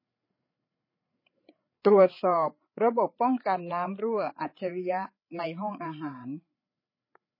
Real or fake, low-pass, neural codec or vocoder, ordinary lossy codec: fake; 5.4 kHz; codec, 16 kHz, 8 kbps, FreqCodec, larger model; MP3, 24 kbps